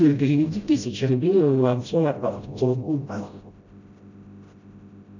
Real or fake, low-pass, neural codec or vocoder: fake; 7.2 kHz; codec, 16 kHz, 0.5 kbps, FreqCodec, smaller model